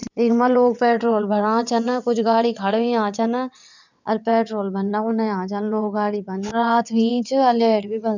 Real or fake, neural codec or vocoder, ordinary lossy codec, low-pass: fake; vocoder, 22.05 kHz, 80 mel bands, WaveNeXt; none; 7.2 kHz